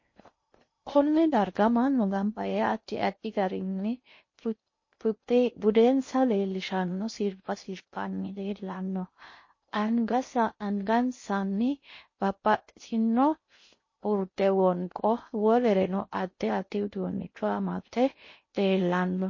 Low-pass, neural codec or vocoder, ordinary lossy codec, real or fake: 7.2 kHz; codec, 16 kHz in and 24 kHz out, 0.6 kbps, FocalCodec, streaming, 2048 codes; MP3, 32 kbps; fake